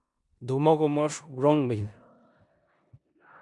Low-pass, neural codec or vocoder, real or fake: 10.8 kHz; codec, 16 kHz in and 24 kHz out, 0.9 kbps, LongCat-Audio-Codec, four codebook decoder; fake